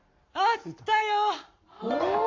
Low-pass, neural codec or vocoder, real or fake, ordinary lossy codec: 7.2 kHz; codec, 44.1 kHz, 7.8 kbps, Pupu-Codec; fake; MP3, 48 kbps